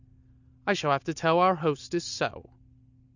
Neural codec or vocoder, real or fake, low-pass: none; real; 7.2 kHz